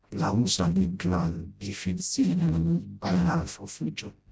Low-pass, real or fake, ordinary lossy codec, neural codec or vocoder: none; fake; none; codec, 16 kHz, 0.5 kbps, FreqCodec, smaller model